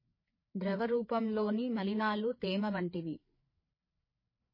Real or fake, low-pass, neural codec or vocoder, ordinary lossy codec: fake; 5.4 kHz; codec, 16 kHz, 4 kbps, FreqCodec, larger model; MP3, 24 kbps